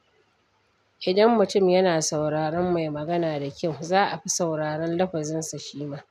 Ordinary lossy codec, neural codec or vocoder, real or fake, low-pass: none; none; real; 14.4 kHz